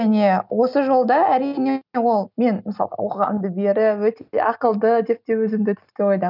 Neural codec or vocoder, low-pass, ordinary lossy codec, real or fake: none; 5.4 kHz; none; real